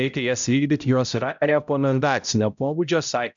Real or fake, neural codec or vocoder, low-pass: fake; codec, 16 kHz, 0.5 kbps, X-Codec, HuBERT features, trained on balanced general audio; 7.2 kHz